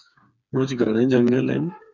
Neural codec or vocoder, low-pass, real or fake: codec, 16 kHz, 4 kbps, FreqCodec, smaller model; 7.2 kHz; fake